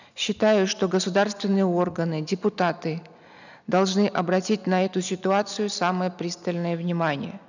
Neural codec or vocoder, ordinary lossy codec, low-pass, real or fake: none; none; 7.2 kHz; real